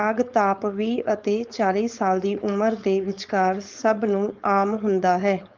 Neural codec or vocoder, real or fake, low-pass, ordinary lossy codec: codec, 16 kHz, 4.8 kbps, FACodec; fake; 7.2 kHz; Opus, 24 kbps